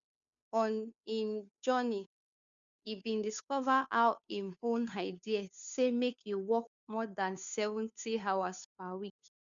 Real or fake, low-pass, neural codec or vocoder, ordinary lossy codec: fake; 7.2 kHz; codec, 16 kHz, 2 kbps, FunCodec, trained on Chinese and English, 25 frames a second; none